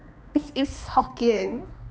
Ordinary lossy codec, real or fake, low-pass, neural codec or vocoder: none; fake; none; codec, 16 kHz, 2 kbps, X-Codec, HuBERT features, trained on balanced general audio